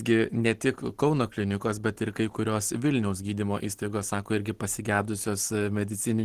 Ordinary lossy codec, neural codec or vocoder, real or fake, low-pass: Opus, 16 kbps; none; real; 14.4 kHz